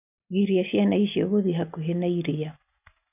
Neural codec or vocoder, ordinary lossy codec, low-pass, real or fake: none; none; 3.6 kHz; real